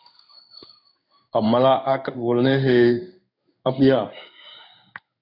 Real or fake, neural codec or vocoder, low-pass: fake; codec, 16 kHz in and 24 kHz out, 1 kbps, XY-Tokenizer; 5.4 kHz